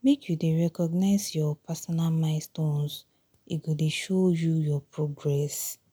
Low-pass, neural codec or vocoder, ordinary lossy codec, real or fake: none; none; none; real